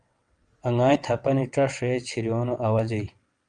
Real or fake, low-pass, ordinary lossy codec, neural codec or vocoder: fake; 9.9 kHz; Opus, 32 kbps; vocoder, 22.05 kHz, 80 mel bands, WaveNeXt